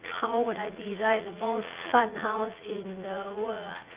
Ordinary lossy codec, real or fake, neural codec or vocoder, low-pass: Opus, 32 kbps; fake; vocoder, 44.1 kHz, 80 mel bands, Vocos; 3.6 kHz